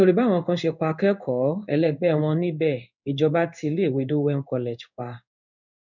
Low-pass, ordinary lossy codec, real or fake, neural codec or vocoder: 7.2 kHz; none; fake; codec, 16 kHz in and 24 kHz out, 1 kbps, XY-Tokenizer